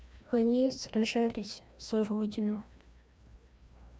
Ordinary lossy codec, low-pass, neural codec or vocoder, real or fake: none; none; codec, 16 kHz, 1 kbps, FreqCodec, larger model; fake